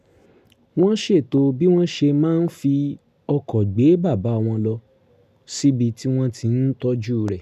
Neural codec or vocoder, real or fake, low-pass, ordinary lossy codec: none; real; 14.4 kHz; none